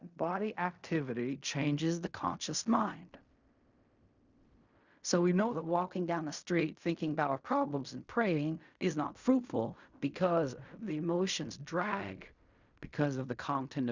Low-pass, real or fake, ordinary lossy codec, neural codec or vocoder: 7.2 kHz; fake; Opus, 64 kbps; codec, 16 kHz in and 24 kHz out, 0.4 kbps, LongCat-Audio-Codec, fine tuned four codebook decoder